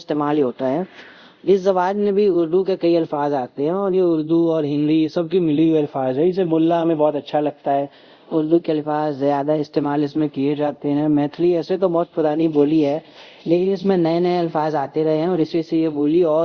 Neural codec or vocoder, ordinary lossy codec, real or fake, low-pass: codec, 24 kHz, 0.5 kbps, DualCodec; Opus, 64 kbps; fake; 7.2 kHz